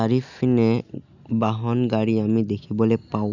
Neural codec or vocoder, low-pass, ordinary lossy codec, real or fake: none; 7.2 kHz; none; real